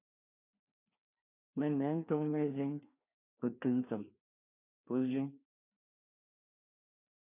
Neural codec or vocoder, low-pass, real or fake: codec, 16 kHz, 2 kbps, FreqCodec, larger model; 3.6 kHz; fake